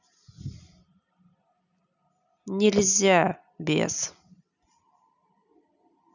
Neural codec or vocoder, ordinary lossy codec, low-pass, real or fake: none; none; 7.2 kHz; real